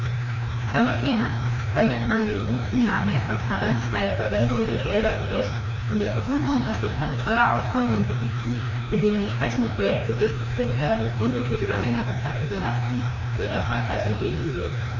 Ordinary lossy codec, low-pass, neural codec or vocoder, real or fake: MP3, 64 kbps; 7.2 kHz; codec, 16 kHz, 1 kbps, FreqCodec, larger model; fake